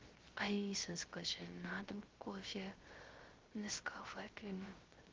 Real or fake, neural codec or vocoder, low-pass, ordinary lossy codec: fake; codec, 16 kHz, 0.3 kbps, FocalCodec; 7.2 kHz; Opus, 16 kbps